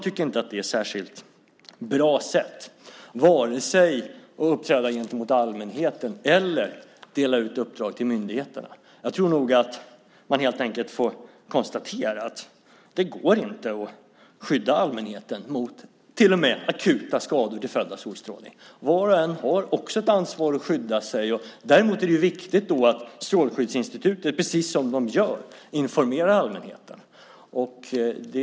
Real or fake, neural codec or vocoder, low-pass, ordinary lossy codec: real; none; none; none